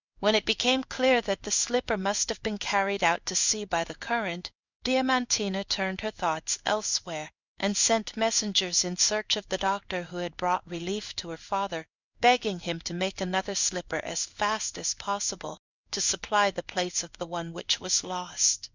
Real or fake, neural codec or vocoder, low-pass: fake; codec, 16 kHz in and 24 kHz out, 1 kbps, XY-Tokenizer; 7.2 kHz